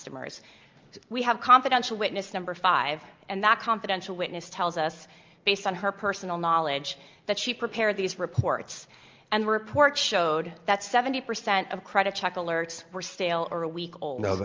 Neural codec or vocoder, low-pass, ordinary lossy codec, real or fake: none; 7.2 kHz; Opus, 24 kbps; real